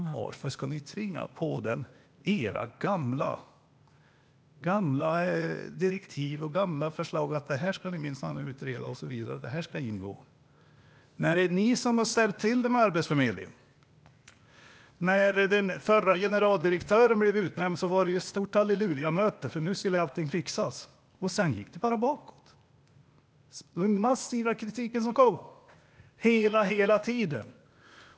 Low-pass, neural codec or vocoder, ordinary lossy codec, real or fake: none; codec, 16 kHz, 0.8 kbps, ZipCodec; none; fake